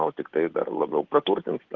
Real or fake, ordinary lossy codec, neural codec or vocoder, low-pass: real; Opus, 16 kbps; none; 7.2 kHz